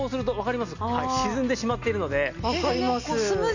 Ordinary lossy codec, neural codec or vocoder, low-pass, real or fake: none; none; 7.2 kHz; real